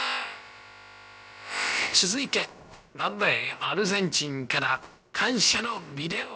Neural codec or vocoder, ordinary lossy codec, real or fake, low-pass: codec, 16 kHz, about 1 kbps, DyCAST, with the encoder's durations; none; fake; none